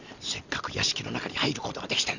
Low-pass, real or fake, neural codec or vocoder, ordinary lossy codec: 7.2 kHz; real; none; none